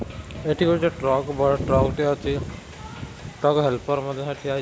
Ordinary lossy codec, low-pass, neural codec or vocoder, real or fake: none; none; none; real